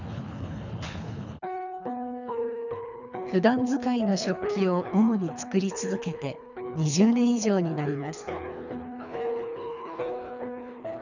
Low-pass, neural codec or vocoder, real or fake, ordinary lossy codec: 7.2 kHz; codec, 24 kHz, 3 kbps, HILCodec; fake; none